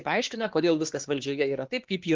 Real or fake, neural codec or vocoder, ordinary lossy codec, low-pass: fake; codec, 16 kHz, 2 kbps, X-Codec, HuBERT features, trained on LibriSpeech; Opus, 16 kbps; 7.2 kHz